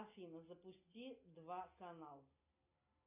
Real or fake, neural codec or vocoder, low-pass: real; none; 3.6 kHz